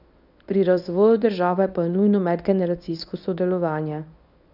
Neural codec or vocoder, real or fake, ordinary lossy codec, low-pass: codec, 24 kHz, 0.9 kbps, WavTokenizer, medium speech release version 1; fake; none; 5.4 kHz